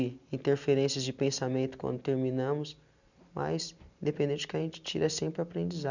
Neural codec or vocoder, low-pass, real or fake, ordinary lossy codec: none; 7.2 kHz; real; none